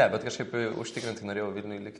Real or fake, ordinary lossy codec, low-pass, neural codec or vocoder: real; MP3, 48 kbps; 14.4 kHz; none